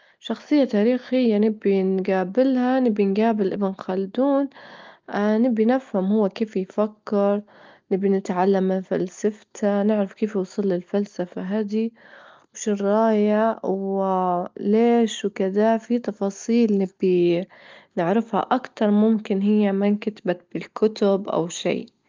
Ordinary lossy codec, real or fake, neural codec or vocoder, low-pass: Opus, 24 kbps; real; none; 7.2 kHz